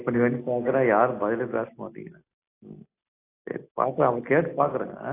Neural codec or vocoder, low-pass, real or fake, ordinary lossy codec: none; 3.6 kHz; real; AAC, 24 kbps